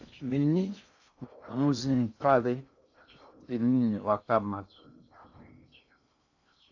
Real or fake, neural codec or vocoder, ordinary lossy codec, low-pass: fake; codec, 16 kHz in and 24 kHz out, 0.6 kbps, FocalCodec, streaming, 2048 codes; AAC, 48 kbps; 7.2 kHz